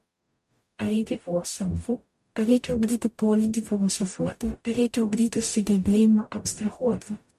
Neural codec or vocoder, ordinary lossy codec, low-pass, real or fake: codec, 44.1 kHz, 0.9 kbps, DAC; MP3, 64 kbps; 14.4 kHz; fake